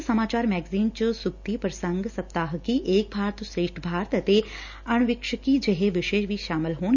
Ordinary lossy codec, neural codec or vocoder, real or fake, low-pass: none; none; real; 7.2 kHz